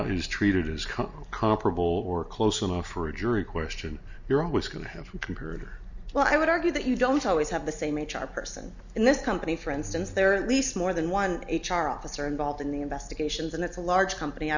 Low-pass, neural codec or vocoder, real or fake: 7.2 kHz; none; real